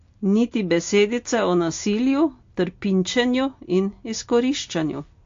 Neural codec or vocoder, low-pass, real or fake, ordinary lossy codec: none; 7.2 kHz; real; AAC, 48 kbps